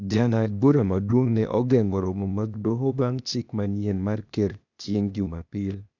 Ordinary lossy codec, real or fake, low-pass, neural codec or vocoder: none; fake; 7.2 kHz; codec, 16 kHz, 0.8 kbps, ZipCodec